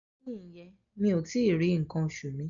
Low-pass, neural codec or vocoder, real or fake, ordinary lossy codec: 7.2 kHz; none; real; none